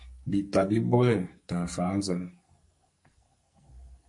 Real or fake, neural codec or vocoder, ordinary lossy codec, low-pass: fake; codec, 44.1 kHz, 2.6 kbps, SNAC; MP3, 48 kbps; 10.8 kHz